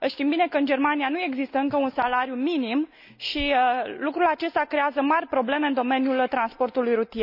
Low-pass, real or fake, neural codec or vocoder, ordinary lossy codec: 5.4 kHz; real; none; none